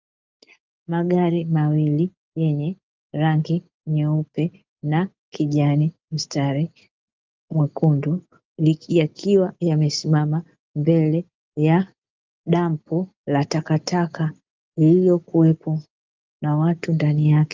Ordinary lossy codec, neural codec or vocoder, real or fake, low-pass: Opus, 32 kbps; none; real; 7.2 kHz